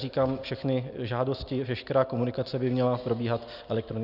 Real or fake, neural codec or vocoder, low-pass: real; none; 5.4 kHz